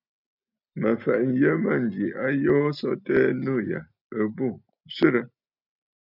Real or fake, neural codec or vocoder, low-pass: real; none; 5.4 kHz